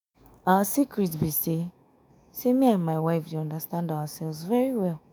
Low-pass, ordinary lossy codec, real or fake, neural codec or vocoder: none; none; fake; autoencoder, 48 kHz, 128 numbers a frame, DAC-VAE, trained on Japanese speech